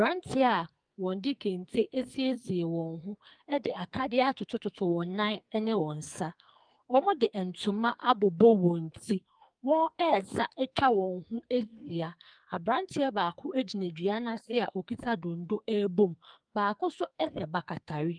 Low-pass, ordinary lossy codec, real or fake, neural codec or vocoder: 9.9 kHz; Opus, 32 kbps; fake; codec, 44.1 kHz, 2.6 kbps, SNAC